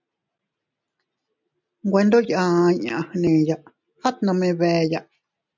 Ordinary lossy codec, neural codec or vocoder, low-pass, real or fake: MP3, 64 kbps; none; 7.2 kHz; real